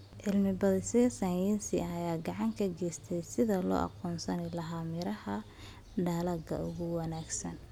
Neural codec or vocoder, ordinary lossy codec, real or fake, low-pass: none; none; real; 19.8 kHz